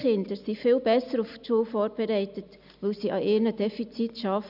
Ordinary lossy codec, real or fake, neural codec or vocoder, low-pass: none; real; none; 5.4 kHz